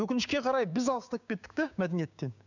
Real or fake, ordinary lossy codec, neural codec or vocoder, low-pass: fake; none; codec, 16 kHz, 6 kbps, DAC; 7.2 kHz